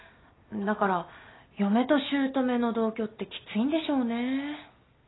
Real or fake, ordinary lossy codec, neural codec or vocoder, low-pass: real; AAC, 16 kbps; none; 7.2 kHz